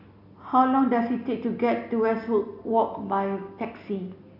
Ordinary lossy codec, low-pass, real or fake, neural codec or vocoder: AAC, 48 kbps; 5.4 kHz; real; none